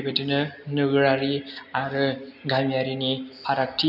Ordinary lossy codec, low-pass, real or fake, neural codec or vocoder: none; 5.4 kHz; real; none